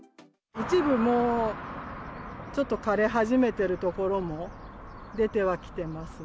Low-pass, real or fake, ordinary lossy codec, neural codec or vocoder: none; real; none; none